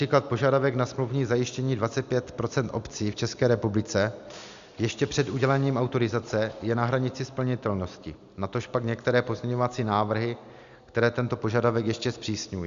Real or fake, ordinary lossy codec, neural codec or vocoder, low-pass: real; Opus, 64 kbps; none; 7.2 kHz